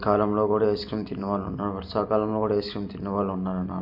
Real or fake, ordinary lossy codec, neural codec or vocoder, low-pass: real; MP3, 48 kbps; none; 5.4 kHz